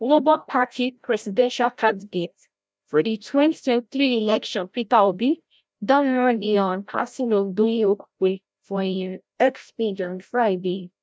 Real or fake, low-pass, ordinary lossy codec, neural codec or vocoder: fake; none; none; codec, 16 kHz, 0.5 kbps, FreqCodec, larger model